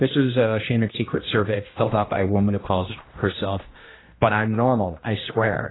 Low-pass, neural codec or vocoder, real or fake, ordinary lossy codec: 7.2 kHz; codec, 16 kHz, 1 kbps, X-Codec, HuBERT features, trained on general audio; fake; AAC, 16 kbps